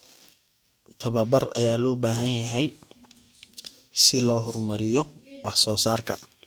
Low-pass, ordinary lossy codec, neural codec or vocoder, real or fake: none; none; codec, 44.1 kHz, 2.6 kbps, DAC; fake